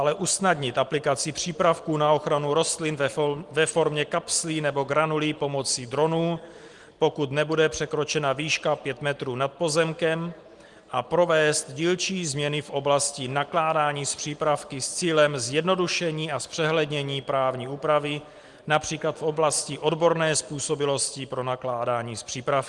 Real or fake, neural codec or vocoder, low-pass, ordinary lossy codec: real; none; 10.8 kHz; Opus, 24 kbps